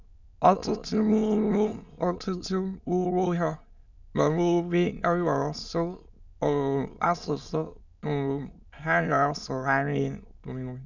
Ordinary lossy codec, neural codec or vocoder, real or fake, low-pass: none; autoencoder, 22.05 kHz, a latent of 192 numbers a frame, VITS, trained on many speakers; fake; 7.2 kHz